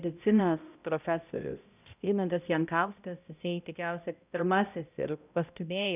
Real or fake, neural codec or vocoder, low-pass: fake; codec, 16 kHz, 0.5 kbps, X-Codec, HuBERT features, trained on balanced general audio; 3.6 kHz